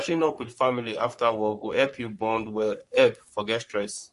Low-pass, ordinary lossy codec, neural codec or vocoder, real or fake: 14.4 kHz; MP3, 48 kbps; codec, 44.1 kHz, 3.4 kbps, Pupu-Codec; fake